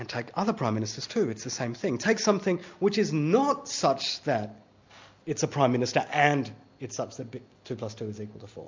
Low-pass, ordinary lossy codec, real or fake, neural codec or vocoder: 7.2 kHz; MP3, 64 kbps; real; none